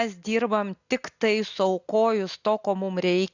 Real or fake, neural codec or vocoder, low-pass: real; none; 7.2 kHz